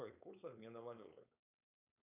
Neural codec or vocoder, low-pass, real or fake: codec, 16 kHz, 4.8 kbps, FACodec; 3.6 kHz; fake